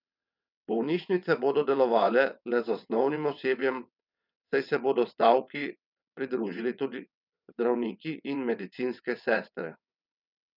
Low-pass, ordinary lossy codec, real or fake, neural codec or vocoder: 5.4 kHz; none; fake; vocoder, 22.05 kHz, 80 mel bands, WaveNeXt